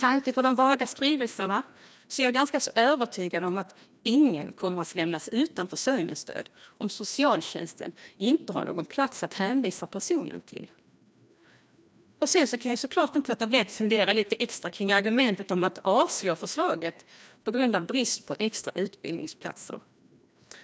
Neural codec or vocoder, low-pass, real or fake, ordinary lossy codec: codec, 16 kHz, 1 kbps, FreqCodec, larger model; none; fake; none